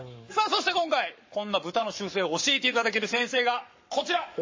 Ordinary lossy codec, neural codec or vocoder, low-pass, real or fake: MP3, 32 kbps; codec, 44.1 kHz, 7.8 kbps, Pupu-Codec; 7.2 kHz; fake